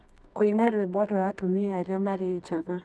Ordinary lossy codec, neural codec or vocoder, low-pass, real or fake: none; codec, 24 kHz, 0.9 kbps, WavTokenizer, medium music audio release; none; fake